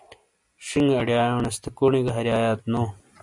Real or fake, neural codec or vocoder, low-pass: real; none; 10.8 kHz